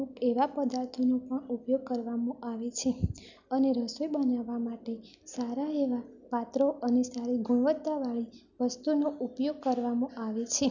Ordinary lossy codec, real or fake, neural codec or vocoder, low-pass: none; real; none; 7.2 kHz